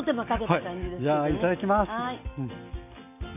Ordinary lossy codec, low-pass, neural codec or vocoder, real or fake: none; 3.6 kHz; none; real